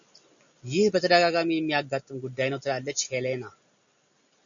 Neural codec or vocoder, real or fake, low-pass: none; real; 7.2 kHz